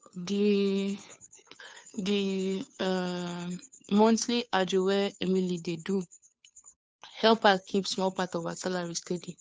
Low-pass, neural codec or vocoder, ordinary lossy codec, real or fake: none; codec, 16 kHz, 2 kbps, FunCodec, trained on Chinese and English, 25 frames a second; none; fake